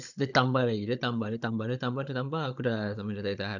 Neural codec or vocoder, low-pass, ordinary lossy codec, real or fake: codec, 16 kHz, 16 kbps, FunCodec, trained on Chinese and English, 50 frames a second; 7.2 kHz; none; fake